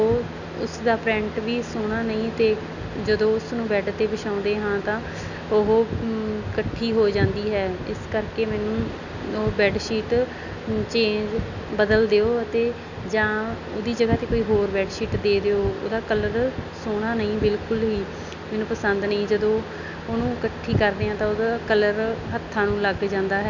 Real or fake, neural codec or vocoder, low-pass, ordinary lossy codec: real; none; 7.2 kHz; none